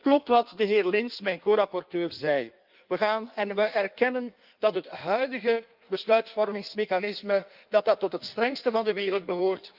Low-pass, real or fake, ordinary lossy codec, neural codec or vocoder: 5.4 kHz; fake; Opus, 24 kbps; codec, 16 kHz in and 24 kHz out, 1.1 kbps, FireRedTTS-2 codec